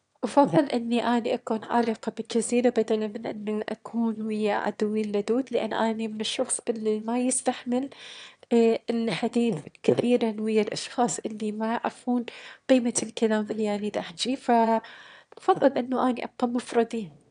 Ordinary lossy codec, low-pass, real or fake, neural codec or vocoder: none; 9.9 kHz; fake; autoencoder, 22.05 kHz, a latent of 192 numbers a frame, VITS, trained on one speaker